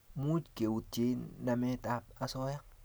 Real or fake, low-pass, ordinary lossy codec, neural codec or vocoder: real; none; none; none